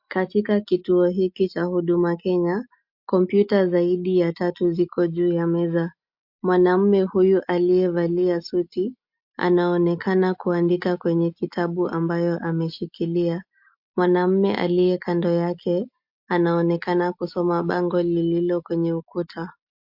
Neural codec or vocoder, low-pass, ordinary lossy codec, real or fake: none; 5.4 kHz; MP3, 48 kbps; real